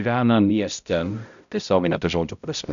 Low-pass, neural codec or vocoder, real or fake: 7.2 kHz; codec, 16 kHz, 0.5 kbps, X-Codec, HuBERT features, trained on balanced general audio; fake